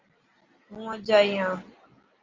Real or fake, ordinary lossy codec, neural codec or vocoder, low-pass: real; Opus, 32 kbps; none; 7.2 kHz